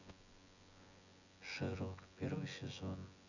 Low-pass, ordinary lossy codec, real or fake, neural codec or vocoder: 7.2 kHz; none; fake; vocoder, 24 kHz, 100 mel bands, Vocos